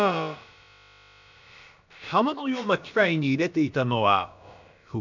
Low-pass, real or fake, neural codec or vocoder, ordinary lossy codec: 7.2 kHz; fake; codec, 16 kHz, about 1 kbps, DyCAST, with the encoder's durations; none